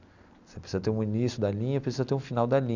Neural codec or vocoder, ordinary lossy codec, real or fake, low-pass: none; none; real; 7.2 kHz